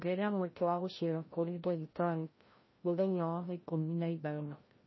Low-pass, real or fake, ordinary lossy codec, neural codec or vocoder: 7.2 kHz; fake; MP3, 24 kbps; codec, 16 kHz, 0.5 kbps, FreqCodec, larger model